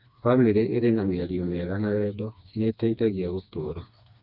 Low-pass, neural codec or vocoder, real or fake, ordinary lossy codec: 5.4 kHz; codec, 16 kHz, 2 kbps, FreqCodec, smaller model; fake; none